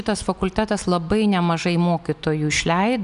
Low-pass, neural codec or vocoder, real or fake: 10.8 kHz; none; real